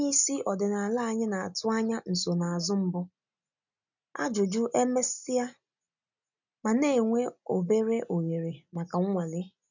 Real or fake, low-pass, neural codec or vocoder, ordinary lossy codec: real; 7.2 kHz; none; none